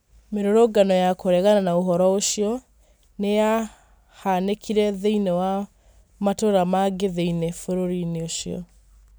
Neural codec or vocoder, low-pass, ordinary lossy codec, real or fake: none; none; none; real